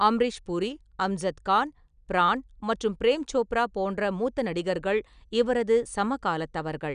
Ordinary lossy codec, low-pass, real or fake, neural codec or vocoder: none; 9.9 kHz; real; none